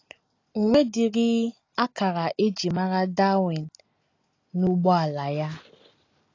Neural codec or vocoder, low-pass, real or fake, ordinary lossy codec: none; 7.2 kHz; real; AAC, 32 kbps